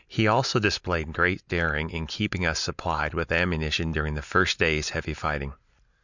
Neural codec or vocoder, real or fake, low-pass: none; real; 7.2 kHz